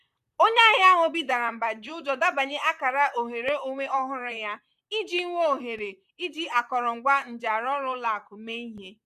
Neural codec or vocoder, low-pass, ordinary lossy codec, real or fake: vocoder, 44.1 kHz, 128 mel bands, Pupu-Vocoder; 14.4 kHz; none; fake